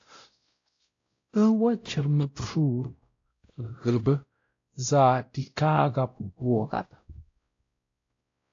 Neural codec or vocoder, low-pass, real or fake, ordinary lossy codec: codec, 16 kHz, 0.5 kbps, X-Codec, WavLM features, trained on Multilingual LibriSpeech; 7.2 kHz; fake; AAC, 48 kbps